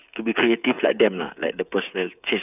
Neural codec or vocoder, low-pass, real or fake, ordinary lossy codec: codec, 16 kHz, 8 kbps, FreqCodec, smaller model; 3.6 kHz; fake; none